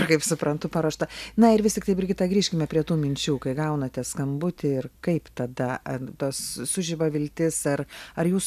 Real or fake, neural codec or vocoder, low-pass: real; none; 14.4 kHz